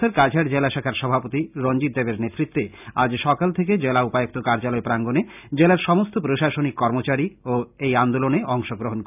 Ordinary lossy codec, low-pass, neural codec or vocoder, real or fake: none; 3.6 kHz; none; real